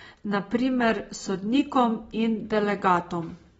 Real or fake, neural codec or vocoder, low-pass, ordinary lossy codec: real; none; 19.8 kHz; AAC, 24 kbps